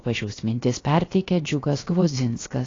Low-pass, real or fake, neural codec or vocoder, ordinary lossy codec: 7.2 kHz; fake; codec, 16 kHz, about 1 kbps, DyCAST, with the encoder's durations; AAC, 32 kbps